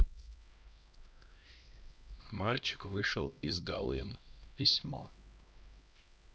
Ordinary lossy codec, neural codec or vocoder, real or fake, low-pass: none; codec, 16 kHz, 1 kbps, X-Codec, HuBERT features, trained on LibriSpeech; fake; none